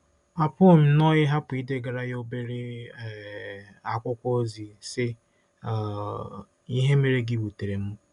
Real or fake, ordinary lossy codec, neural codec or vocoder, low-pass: real; MP3, 96 kbps; none; 10.8 kHz